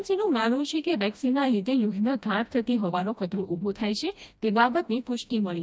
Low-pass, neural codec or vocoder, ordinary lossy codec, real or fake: none; codec, 16 kHz, 1 kbps, FreqCodec, smaller model; none; fake